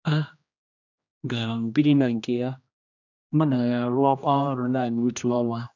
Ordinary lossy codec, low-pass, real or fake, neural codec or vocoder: none; 7.2 kHz; fake; codec, 16 kHz, 1 kbps, X-Codec, HuBERT features, trained on general audio